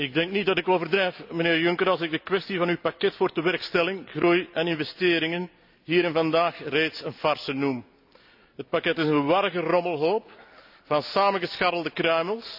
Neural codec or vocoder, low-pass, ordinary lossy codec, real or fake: none; 5.4 kHz; none; real